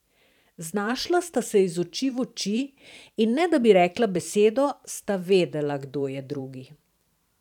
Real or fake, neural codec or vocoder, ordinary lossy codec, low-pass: fake; vocoder, 44.1 kHz, 128 mel bands every 512 samples, BigVGAN v2; none; 19.8 kHz